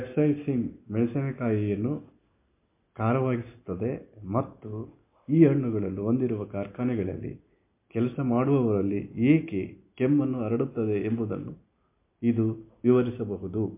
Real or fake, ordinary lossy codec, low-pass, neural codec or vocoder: real; MP3, 24 kbps; 3.6 kHz; none